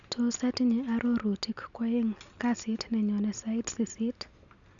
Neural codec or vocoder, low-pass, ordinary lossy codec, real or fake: none; 7.2 kHz; none; real